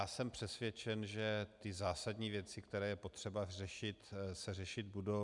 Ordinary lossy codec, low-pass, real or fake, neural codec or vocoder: MP3, 96 kbps; 10.8 kHz; real; none